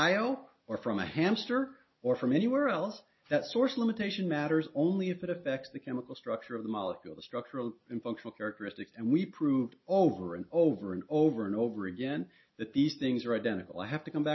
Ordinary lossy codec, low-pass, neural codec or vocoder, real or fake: MP3, 24 kbps; 7.2 kHz; none; real